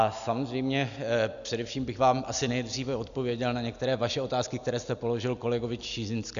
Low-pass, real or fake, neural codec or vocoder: 7.2 kHz; real; none